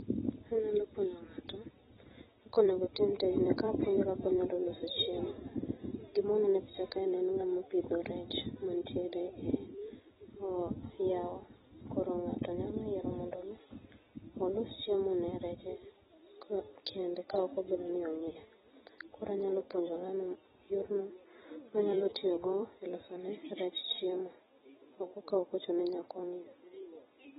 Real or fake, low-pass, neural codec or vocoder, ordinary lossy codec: fake; 19.8 kHz; vocoder, 44.1 kHz, 128 mel bands every 256 samples, BigVGAN v2; AAC, 16 kbps